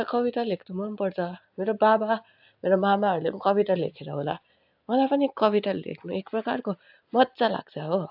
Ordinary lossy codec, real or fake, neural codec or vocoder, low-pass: AAC, 48 kbps; real; none; 5.4 kHz